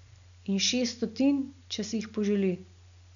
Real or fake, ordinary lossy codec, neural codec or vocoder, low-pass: real; none; none; 7.2 kHz